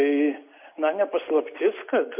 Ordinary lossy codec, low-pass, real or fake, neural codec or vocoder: MP3, 32 kbps; 3.6 kHz; real; none